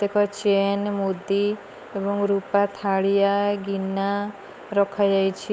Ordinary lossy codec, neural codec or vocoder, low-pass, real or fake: none; codec, 16 kHz, 8 kbps, FunCodec, trained on Chinese and English, 25 frames a second; none; fake